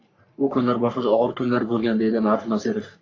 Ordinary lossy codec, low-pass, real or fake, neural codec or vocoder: AAC, 48 kbps; 7.2 kHz; fake; codec, 44.1 kHz, 3.4 kbps, Pupu-Codec